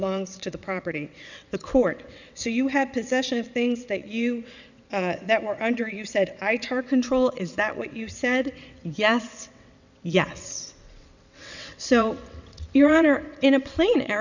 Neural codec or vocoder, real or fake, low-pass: vocoder, 22.05 kHz, 80 mel bands, WaveNeXt; fake; 7.2 kHz